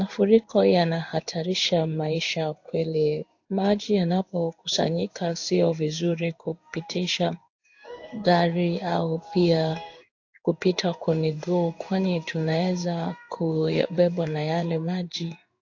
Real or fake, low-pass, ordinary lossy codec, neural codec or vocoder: fake; 7.2 kHz; AAC, 48 kbps; codec, 16 kHz in and 24 kHz out, 1 kbps, XY-Tokenizer